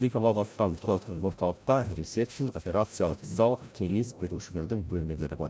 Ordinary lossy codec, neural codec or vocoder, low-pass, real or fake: none; codec, 16 kHz, 0.5 kbps, FreqCodec, larger model; none; fake